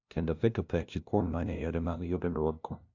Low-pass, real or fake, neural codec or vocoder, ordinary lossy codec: 7.2 kHz; fake; codec, 16 kHz, 0.5 kbps, FunCodec, trained on LibriTTS, 25 frames a second; AAC, 48 kbps